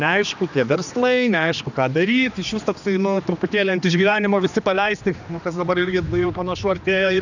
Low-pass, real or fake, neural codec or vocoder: 7.2 kHz; fake; codec, 16 kHz, 2 kbps, X-Codec, HuBERT features, trained on general audio